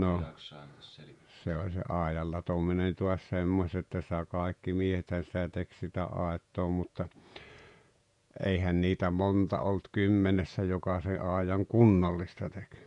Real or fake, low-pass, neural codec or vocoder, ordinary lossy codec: fake; 10.8 kHz; vocoder, 48 kHz, 128 mel bands, Vocos; none